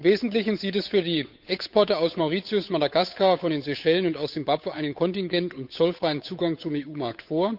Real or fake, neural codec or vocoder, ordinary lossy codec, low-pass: fake; codec, 16 kHz, 8 kbps, FunCodec, trained on Chinese and English, 25 frames a second; none; 5.4 kHz